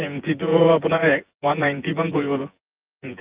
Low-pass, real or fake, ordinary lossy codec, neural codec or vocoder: 3.6 kHz; fake; Opus, 24 kbps; vocoder, 24 kHz, 100 mel bands, Vocos